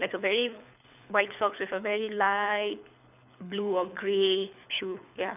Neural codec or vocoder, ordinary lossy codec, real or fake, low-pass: codec, 24 kHz, 6 kbps, HILCodec; none; fake; 3.6 kHz